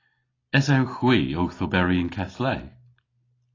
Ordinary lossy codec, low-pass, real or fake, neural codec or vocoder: AAC, 32 kbps; 7.2 kHz; real; none